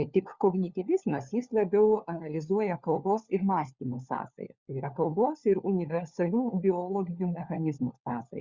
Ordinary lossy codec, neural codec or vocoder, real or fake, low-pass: Opus, 64 kbps; codec, 16 kHz, 4 kbps, FunCodec, trained on LibriTTS, 50 frames a second; fake; 7.2 kHz